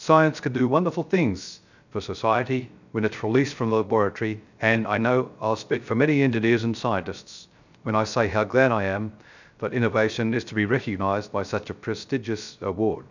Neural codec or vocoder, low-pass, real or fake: codec, 16 kHz, 0.3 kbps, FocalCodec; 7.2 kHz; fake